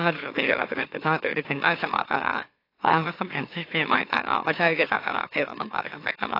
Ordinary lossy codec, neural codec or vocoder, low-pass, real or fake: AAC, 32 kbps; autoencoder, 44.1 kHz, a latent of 192 numbers a frame, MeloTTS; 5.4 kHz; fake